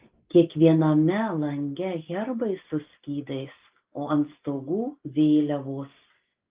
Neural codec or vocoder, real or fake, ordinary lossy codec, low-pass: none; real; Opus, 32 kbps; 3.6 kHz